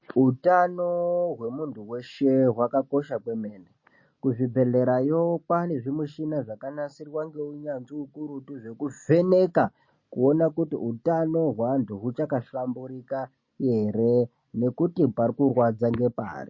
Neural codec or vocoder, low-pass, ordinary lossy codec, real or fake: none; 7.2 kHz; MP3, 32 kbps; real